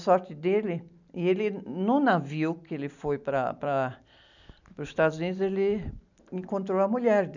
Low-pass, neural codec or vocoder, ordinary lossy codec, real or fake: 7.2 kHz; none; none; real